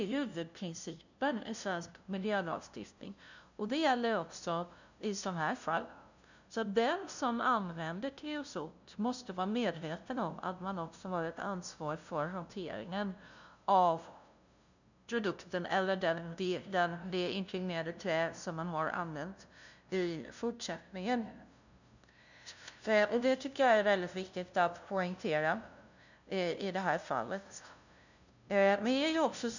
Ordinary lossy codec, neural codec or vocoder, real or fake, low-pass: none; codec, 16 kHz, 0.5 kbps, FunCodec, trained on LibriTTS, 25 frames a second; fake; 7.2 kHz